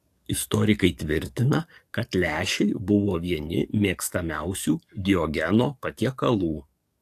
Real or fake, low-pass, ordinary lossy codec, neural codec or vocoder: fake; 14.4 kHz; AAC, 64 kbps; codec, 44.1 kHz, 7.8 kbps, DAC